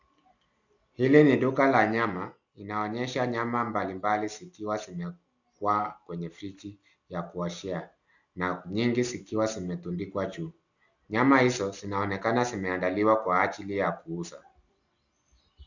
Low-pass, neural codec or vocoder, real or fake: 7.2 kHz; none; real